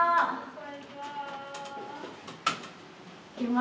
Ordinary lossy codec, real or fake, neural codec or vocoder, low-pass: none; real; none; none